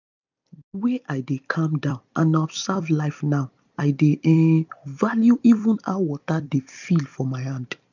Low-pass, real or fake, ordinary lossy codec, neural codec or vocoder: 7.2 kHz; fake; none; vocoder, 44.1 kHz, 128 mel bands every 256 samples, BigVGAN v2